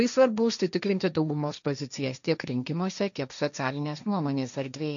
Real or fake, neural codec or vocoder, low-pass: fake; codec, 16 kHz, 1.1 kbps, Voila-Tokenizer; 7.2 kHz